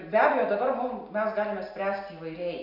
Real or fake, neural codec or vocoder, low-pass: real; none; 5.4 kHz